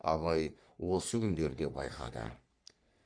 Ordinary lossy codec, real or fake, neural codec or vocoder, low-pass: none; fake; codec, 44.1 kHz, 3.4 kbps, Pupu-Codec; 9.9 kHz